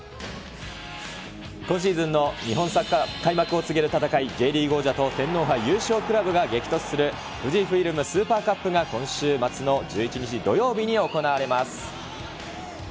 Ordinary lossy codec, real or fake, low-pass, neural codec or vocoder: none; real; none; none